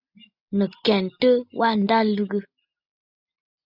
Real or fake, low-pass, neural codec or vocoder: real; 5.4 kHz; none